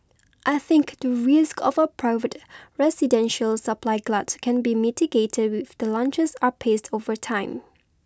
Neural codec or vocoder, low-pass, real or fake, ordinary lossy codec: none; none; real; none